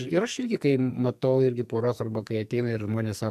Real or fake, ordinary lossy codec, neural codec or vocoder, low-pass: fake; MP3, 96 kbps; codec, 44.1 kHz, 2.6 kbps, SNAC; 14.4 kHz